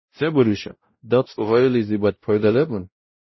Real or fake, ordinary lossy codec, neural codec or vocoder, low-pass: fake; MP3, 24 kbps; codec, 16 kHz, 0.5 kbps, X-Codec, WavLM features, trained on Multilingual LibriSpeech; 7.2 kHz